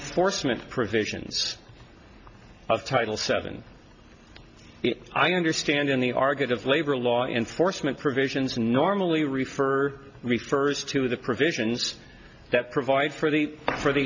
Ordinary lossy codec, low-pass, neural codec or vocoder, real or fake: AAC, 48 kbps; 7.2 kHz; none; real